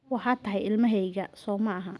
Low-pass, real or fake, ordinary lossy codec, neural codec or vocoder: none; real; none; none